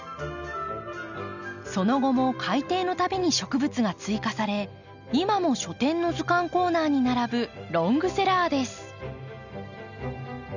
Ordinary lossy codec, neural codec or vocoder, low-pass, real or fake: none; none; 7.2 kHz; real